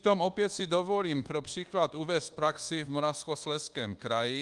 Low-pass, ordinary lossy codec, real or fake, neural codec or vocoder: 10.8 kHz; Opus, 24 kbps; fake; codec, 24 kHz, 1.2 kbps, DualCodec